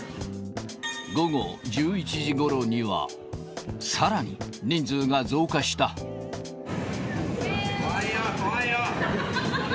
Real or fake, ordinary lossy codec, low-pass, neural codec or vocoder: real; none; none; none